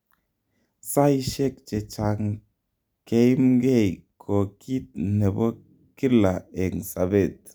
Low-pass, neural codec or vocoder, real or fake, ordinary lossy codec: none; none; real; none